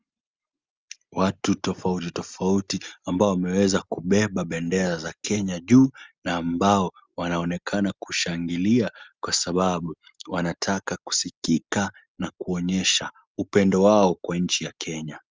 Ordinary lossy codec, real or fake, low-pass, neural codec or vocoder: Opus, 32 kbps; real; 7.2 kHz; none